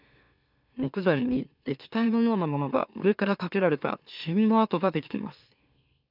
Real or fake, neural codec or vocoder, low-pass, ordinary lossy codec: fake; autoencoder, 44.1 kHz, a latent of 192 numbers a frame, MeloTTS; 5.4 kHz; none